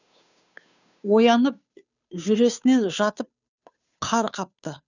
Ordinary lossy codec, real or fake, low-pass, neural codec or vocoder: none; fake; 7.2 kHz; codec, 16 kHz, 2 kbps, FunCodec, trained on Chinese and English, 25 frames a second